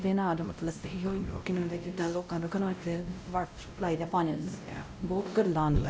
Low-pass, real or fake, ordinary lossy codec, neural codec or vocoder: none; fake; none; codec, 16 kHz, 0.5 kbps, X-Codec, WavLM features, trained on Multilingual LibriSpeech